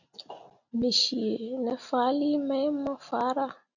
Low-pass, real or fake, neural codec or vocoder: 7.2 kHz; real; none